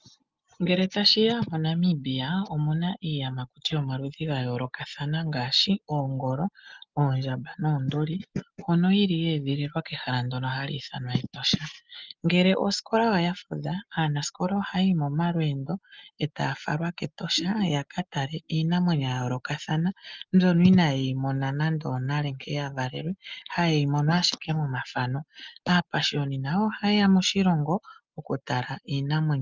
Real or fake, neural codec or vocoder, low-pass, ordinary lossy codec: real; none; 7.2 kHz; Opus, 24 kbps